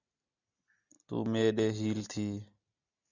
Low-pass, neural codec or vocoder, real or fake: 7.2 kHz; none; real